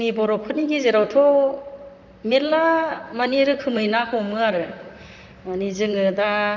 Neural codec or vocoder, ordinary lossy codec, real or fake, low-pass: vocoder, 44.1 kHz, 128 mel bands, Pupu-Vocoder; none; fake; 7.2 kHz